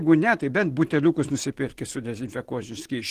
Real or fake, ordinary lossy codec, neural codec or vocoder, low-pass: real; Opus, 16 kbps; none; 14.4 kHz